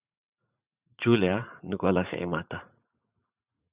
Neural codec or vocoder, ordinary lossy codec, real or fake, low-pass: vocoder, 44.1 kHz, 80 mel bands, Vocos; Opus, 64 kbps; fake; 3.6 kHz